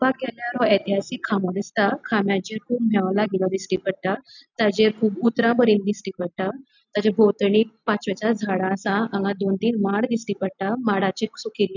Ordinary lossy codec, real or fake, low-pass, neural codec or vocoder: none; real; 7.2 kHz; none